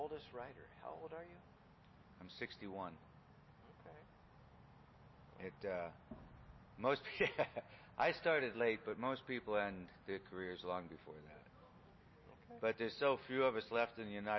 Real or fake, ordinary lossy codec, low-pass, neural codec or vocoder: real; MP3, 24 kbps; 7.2 kHz; none